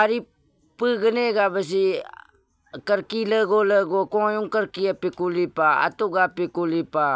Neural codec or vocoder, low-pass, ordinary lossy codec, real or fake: none; none; none; real